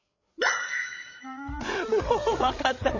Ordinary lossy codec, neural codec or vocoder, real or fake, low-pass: MP3, 32 kbps; codec, 16 kHz, 8 kbps, FreqCodec, larger model; fake; 7.2 kHz